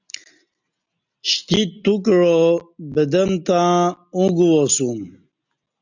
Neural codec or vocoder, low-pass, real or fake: none; 7.2 kHz; real